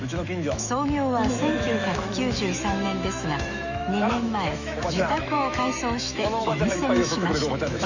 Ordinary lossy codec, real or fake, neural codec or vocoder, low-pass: none; fake; autoencoder, 48 kHz, 128 numbers a frame, DAC-VAE, trained on Japanese speech; 7.2 kHz